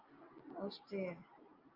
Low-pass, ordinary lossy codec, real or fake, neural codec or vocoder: 5.4 kHz; Opus, 24 kbps; real; none